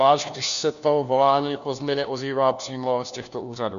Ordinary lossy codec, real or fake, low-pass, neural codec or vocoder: MP3, 96 kbps; fake; 7.2 kHz; codec, 16 kHz, 1 kbps, FunCodec, trained on LibriTTS, 50 frames a second